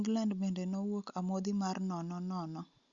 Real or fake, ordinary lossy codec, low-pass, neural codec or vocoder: real; Opus, 64 kbps; 7.2 kHz; none